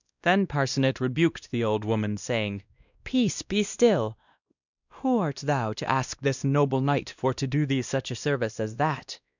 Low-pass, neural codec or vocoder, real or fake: 7.2 kHz; codec, 16 kHz, 1 kbps, X-Codec, WavLM features, trained on Multilingual LibriSpeech; fake